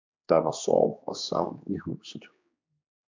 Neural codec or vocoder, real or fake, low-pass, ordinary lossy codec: codec, 16 kHz, 2 kbps, X-Codec, HuBERT features, trained on general audio; fake; 7.2 kHz; MP3, 64 kbps